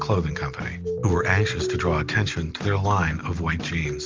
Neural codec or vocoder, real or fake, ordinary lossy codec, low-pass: none; real; Opus, 32 kbps; 7.2 kHz